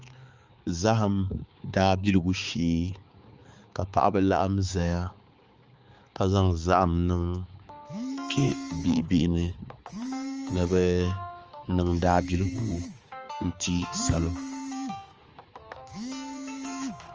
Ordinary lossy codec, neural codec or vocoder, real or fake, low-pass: Opus, 24 kbps; codec, 16 kHz, 4 kbps, X-Codec, HuBERT features, trained on balanced general audio; fake; 7.2 kHz